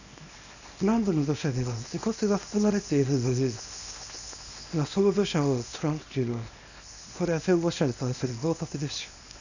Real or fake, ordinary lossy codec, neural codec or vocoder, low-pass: fake; none; codec, 24 kHz, 0.9 kbps, WavTokenizer, small release; 7.2 kHz